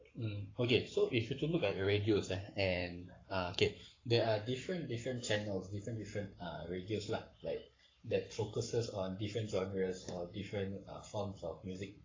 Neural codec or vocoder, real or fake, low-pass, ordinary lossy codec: codec, 44.1 kHz, 7.8 kbps, Pupu-Codec; fake; 7.2 kHz; AAC, 32 kbps